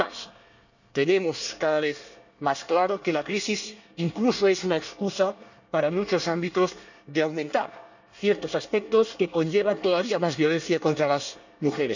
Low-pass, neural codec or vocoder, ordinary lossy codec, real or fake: 7.2 kHz; codec, 24 kHz, 1 kbps, SNAC; none; fake